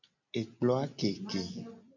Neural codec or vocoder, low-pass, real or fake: none; 7.2 kHz; real